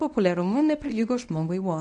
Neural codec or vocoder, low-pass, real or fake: codec, 24 kHz, 0.9 kbps, WavTokenizer, medium speech release version 1; 10.8 kHz; fake